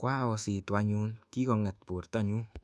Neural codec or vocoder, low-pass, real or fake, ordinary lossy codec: codec, 24 kHz, 1.2 kbps, DualCodec; none; fake; none